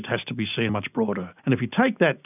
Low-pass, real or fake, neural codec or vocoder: 3.6 kHz; real; none